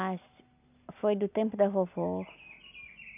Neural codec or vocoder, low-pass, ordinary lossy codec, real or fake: none; 3.6 kHz; AAC, 32 kbps; real